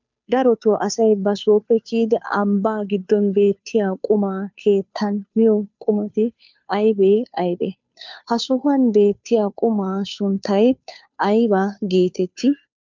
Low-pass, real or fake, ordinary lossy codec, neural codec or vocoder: 7.2 kHz; fake; MP3, 64 kbps; codec, 16 kHz, 2 kbps, FunCodec, trained on Chinese and English, 25 frames a second